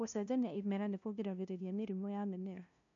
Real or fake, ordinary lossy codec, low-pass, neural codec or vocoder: fake; none; 7.2 kHz; codec, 16 kHz, 0.5 kbps, FunCodec, trained on LibriTTS, 25 frames a second